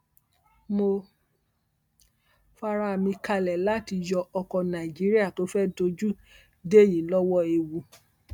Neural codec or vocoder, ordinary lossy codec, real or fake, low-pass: none; none; real; 19.8 kHz